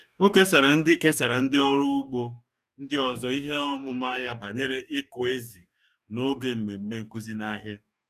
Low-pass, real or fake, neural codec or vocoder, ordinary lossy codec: 14.4 kHz; fake; codec, 44.1 kHz, 2.6 kbps, DAC; none